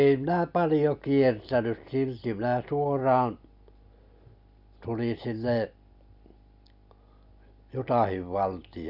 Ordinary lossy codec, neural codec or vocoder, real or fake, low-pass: none; none; real; 5.4 kHz